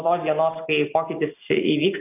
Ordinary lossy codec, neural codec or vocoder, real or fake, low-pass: AAC, 32 kbps; none; real; 3.6 kHz